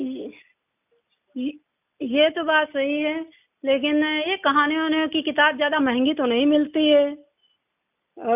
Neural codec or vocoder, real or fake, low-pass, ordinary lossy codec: none; real; 3.6 kHz; none